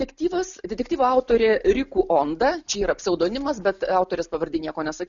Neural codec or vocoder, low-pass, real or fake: none; 7.2 kHz; real